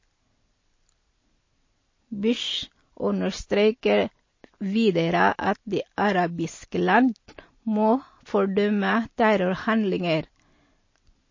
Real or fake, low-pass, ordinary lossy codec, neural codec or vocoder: real; 7.2 kHz; MP3, 32 kbps; none